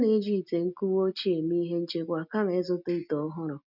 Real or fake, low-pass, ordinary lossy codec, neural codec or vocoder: real; 5.4 kHz; none; none